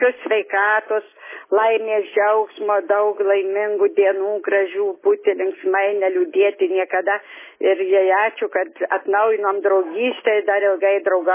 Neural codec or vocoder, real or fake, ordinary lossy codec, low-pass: none; real; MP3, 16 kbps; 3.6 kHz